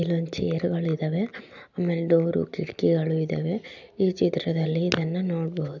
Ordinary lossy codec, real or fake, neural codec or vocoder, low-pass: none; real; none; 7.2 kHz